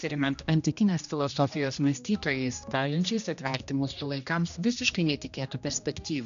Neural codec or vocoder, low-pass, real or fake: codec, 16 kHz, 1 kbps, X-Codec, HuBERT features, trained on general audio; 7.2 kHz; fake